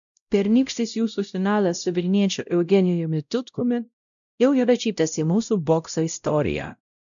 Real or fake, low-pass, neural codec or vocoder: fake; 7.2 kHz; codec, 16 kHz, 0.5 kbps, X-Codec, WavLM features, trained on Multilingual LibriSpeech